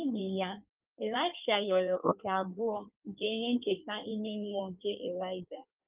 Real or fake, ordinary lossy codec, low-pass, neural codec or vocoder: fake; Opus, 24 kbps; 3.6 kHz; codec, 16 kHz in and 24 kHz out, 1.1 kbps, FireRedTTS-2 codec